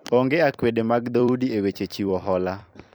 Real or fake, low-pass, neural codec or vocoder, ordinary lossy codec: fake; none; vocoder, 44.1 kHz, 128 mel bands every 256 samples, BigVGAN v2; none